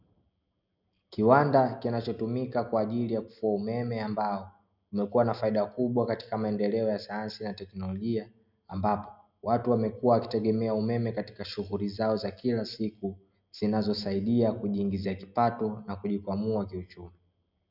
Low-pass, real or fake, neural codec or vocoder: 5.4 kHz; real; none